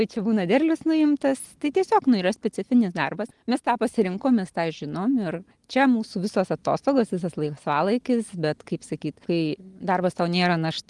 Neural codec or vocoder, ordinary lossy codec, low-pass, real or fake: none; Opus, 24 kbps; 10.8 kHz; real